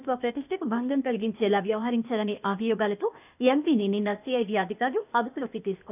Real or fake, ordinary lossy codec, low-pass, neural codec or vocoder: fake; none; 3.6 kHz; codec, 16 kHz, 0.8 kbps, ZipCodec